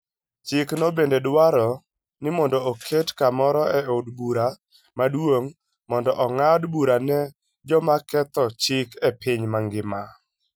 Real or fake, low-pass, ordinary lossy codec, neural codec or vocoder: real; none; none; none